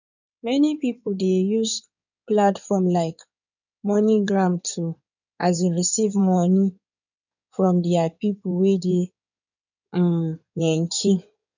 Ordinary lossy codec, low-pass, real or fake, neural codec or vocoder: none; 7.2 kHz; fake; codec, 16 kHz in and 24 kHz out, 2.2 kbps, FireRedTTS-2 codec